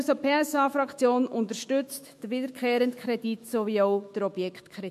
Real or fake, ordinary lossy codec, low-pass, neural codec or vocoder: fake; MP3, 64 kbps; 14.4 kHz; autoencoder, 48 kHz, 128 numbers a frame, DAC-VAE, trained on Japanese speech